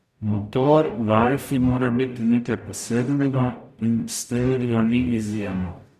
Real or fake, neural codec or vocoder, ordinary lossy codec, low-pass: fake; codec, 44.1 kHz, 0.9 kbps, DAC; none; 14.4 kHz